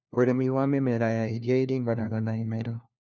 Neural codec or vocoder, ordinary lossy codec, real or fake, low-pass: codec, 16 kHz, 1 kbps, FunCodec, trained on LibriTTS, 50 frames a second; none; fake; none